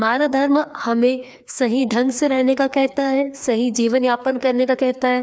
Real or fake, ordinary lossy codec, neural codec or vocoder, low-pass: fake; none; codec, 16 kHz, 2 kbps, FreqCodec, larger model; none